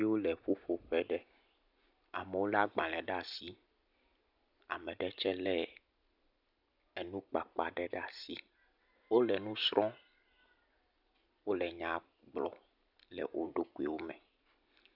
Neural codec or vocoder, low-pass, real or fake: none; 5.4 kHz; real